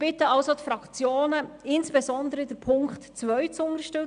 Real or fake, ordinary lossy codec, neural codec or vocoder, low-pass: real; none; none; 9.9 kHz